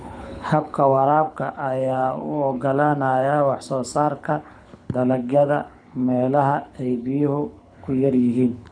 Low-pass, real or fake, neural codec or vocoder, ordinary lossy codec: 9.9 kHz; fake; codec, 24 kHz, 6 kbps, HILCodec; none